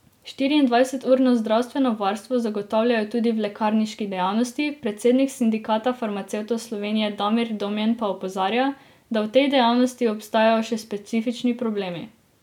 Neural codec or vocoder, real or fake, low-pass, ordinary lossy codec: none; real; 19.8 kHz; none